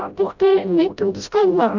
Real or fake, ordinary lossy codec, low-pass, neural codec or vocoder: fake; none; 7.2 kHz; codec, 16 kHz, 0.5 kbps, FreqCodec, smaller model